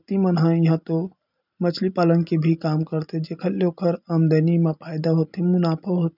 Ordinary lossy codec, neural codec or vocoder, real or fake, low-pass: none; none; real; 5.4 kHz